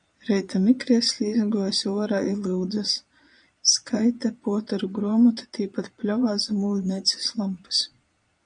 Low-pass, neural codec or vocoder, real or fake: 9.9 kHz; vocoder, 22.05 kHz, 80 mel bands, Vocos; fake